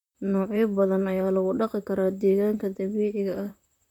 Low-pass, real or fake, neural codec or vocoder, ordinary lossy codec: 19.8 kHz; fake; vocoder, 44.1 kHz, 128 mel bands, Pupu-Vocoder; none